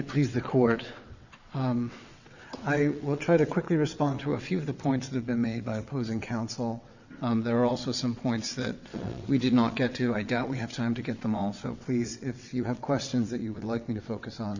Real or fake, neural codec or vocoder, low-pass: fake; vocoder, 22.05 kHz, 80 mel bands, WaveNeXt; 7.2 kHz